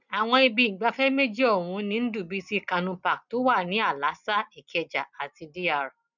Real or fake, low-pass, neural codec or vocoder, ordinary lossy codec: real; 7.2 kHz; none; none